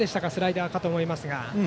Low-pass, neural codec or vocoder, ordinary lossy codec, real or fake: none; none; none; real